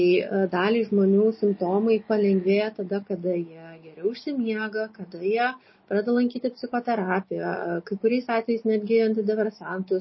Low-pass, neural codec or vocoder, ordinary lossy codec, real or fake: 7.2 kHz; none; MP3, 24 kbps; real